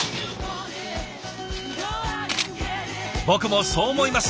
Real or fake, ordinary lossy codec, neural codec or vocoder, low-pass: real; none; none; none